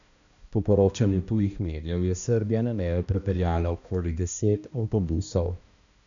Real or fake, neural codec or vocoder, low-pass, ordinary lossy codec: fake; codec, 16 kHz, 1 kbps, X-Codec, HuBERT features, trained on balanced general audio; 7.2 kHz; MP3, 96 kbps